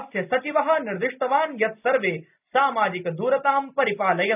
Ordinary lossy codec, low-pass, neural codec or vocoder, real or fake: none; 3.6 kHz; none; real